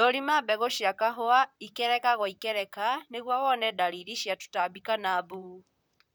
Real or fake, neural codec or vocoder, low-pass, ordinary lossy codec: fake; vocoder, 44.1 kHz, 128 mel bands, Pupu-Vocoder; none; none